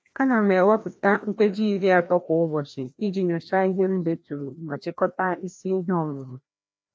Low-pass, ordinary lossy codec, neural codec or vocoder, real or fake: none; none; codec, 16 kHz, 1 kbps, FreqCodec, larger model; fake